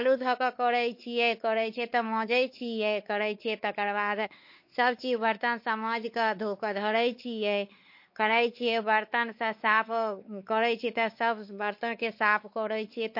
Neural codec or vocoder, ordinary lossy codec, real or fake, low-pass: codec, 16 kHz, 4 kbps, X-Codec, WavLM features, trained on Multilingual LibriSpeech; MP3, 32 kbps; fake; 5.4 kHz